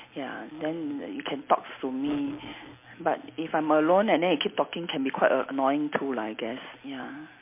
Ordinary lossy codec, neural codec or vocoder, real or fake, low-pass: MP3, 24 kbps; none; real; 3.6 kHz